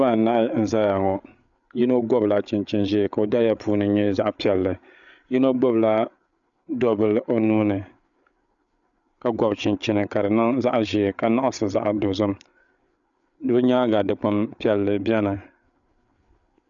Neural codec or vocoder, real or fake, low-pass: codec, 16 kHz, 8 kbps, FreqCodec, larger model; fake; 7.2 kHz